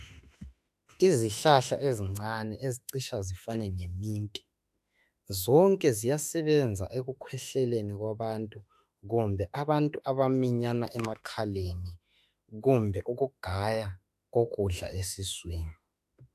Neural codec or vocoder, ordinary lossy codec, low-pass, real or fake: autoencoder, 48 kHz, 32 numbers a frame, DAC-VAE, trained on Japanese speech; AAC, 96 kbps; 14.4 kHz; fake